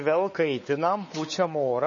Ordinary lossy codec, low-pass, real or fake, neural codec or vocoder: MP3, 32 kbps; 7.2 kHz; fake; codec, 16 kHz, 4 kbps, X-Codec, WavLM features, trained on Multilingual LibriSpeech